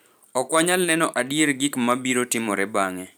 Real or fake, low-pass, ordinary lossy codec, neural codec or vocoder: fake; none; none; vocoder, 44.1 kHz, 128 mel bands every 512 samples, BigVGAN v2